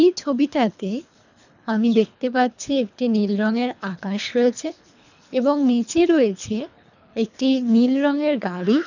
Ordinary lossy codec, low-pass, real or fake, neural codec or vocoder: none; 7.2 kHz; fake; codec, 24 kHz, 3 kbps, HILCodec